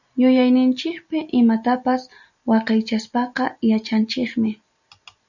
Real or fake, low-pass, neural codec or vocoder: real; 7.2 kHz; none